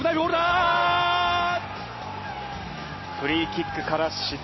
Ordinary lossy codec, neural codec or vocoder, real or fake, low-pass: MP3, 24 kbps; none; real; 7.2 kHz